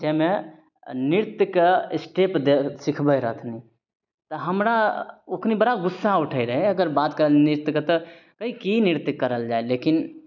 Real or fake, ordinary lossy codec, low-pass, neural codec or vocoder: real; none; 7.2 kHz; none